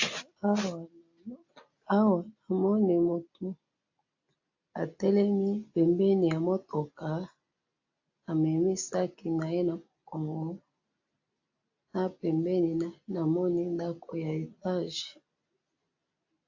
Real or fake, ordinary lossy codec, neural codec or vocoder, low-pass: real; AAC, 48 kbps; none; 7.2 kHz